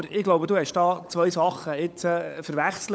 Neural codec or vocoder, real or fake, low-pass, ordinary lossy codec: codec, 16 kHz, 16 kbps, FunCodec, trained on LibriTTS, 50 frames a second; fake; none; none